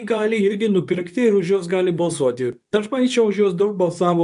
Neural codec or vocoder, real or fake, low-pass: codec, 24 kHz, 0.9 kbps, WavTokenizer, medium speech release version 2; fake; 10.8 kHz